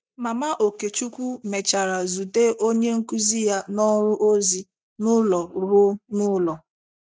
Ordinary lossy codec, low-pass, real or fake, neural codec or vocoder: none; none; real; none